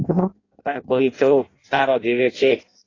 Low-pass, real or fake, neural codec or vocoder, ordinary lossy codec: 7.2 kHz; fake; codec, 16 kHz in and 24 kHz out, 0.6 kbps, FireRedTTS-2 codec; AAC, 32 kbps